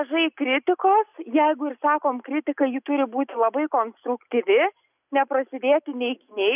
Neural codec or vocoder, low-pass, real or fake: none; 3.6 kHz; real